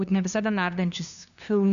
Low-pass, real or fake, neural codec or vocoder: 7.2 kHz; fake; codec, 16 kHz, 2 kbps, FunCodec, trained on LibriTTS, 25 frames a second